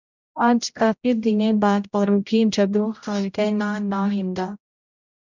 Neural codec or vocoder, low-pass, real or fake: codec, 16 kHz, 0.5 kbps, X-Codec, HuBERT features, trained on general audio; 7.2 kHz; fake